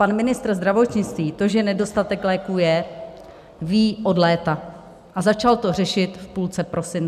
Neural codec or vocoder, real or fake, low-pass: none; real; 14.4 kHz